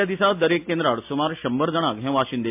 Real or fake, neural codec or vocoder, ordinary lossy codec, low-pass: real; none; MP3, 32 kbps; 3.6 kHz